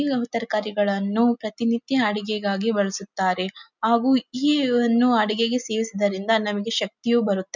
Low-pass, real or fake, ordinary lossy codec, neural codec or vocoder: 7.2 kHz; real; none; none